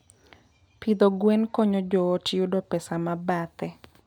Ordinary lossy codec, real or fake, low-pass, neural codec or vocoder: none; real; 19.8 kHz; none